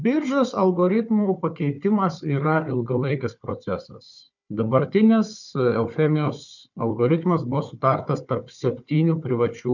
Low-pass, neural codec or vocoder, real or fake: 7.2 kHz; codec, 16 kHz, 4 kbps, FunCodec, trained on Chinese and English, 50 frames a second; fake